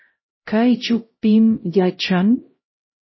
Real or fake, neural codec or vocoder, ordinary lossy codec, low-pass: fake; codec, 16 kHz, 0.5 kbps, X-Codec, HuBERT features, trained on LibriSpeech; MP3, 24 kbps; 7.2 kHz